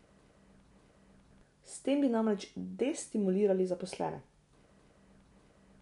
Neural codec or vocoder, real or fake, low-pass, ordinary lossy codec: none; real; 10.8 kHz; none